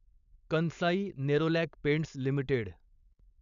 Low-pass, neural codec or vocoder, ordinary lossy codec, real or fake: 7.2 kHz; codec, 16 kHz, 4.8 kbps, FACodec; none; fake